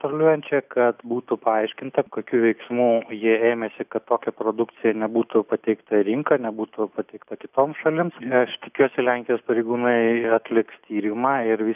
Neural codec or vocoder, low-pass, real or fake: none; 3.6 kHz; real